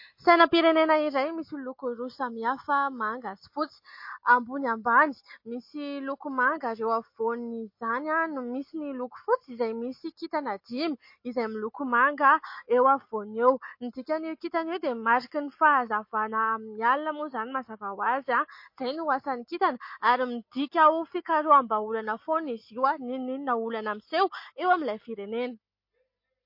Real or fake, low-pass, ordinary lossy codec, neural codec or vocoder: real; 5.4 kHz; MP3, 32 kbps; none